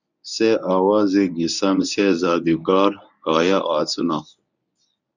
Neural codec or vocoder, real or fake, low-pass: codec, 24 kHz, 0.9 kbps, WavTokenizer, medium speech release version 1; fake; 7.2 kHz